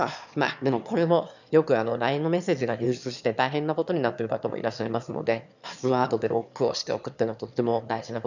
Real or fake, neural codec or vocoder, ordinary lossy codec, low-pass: fake; autoencoder, 22.05 kHz, a latent of 192 numbers a frame, VITS, trained on one speaker; none; 7.2 kHz